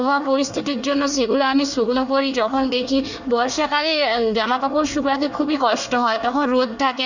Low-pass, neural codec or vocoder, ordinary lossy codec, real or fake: 7.2 kHz; codec, 24 kHz, 1 kbps, SNAC; none; fake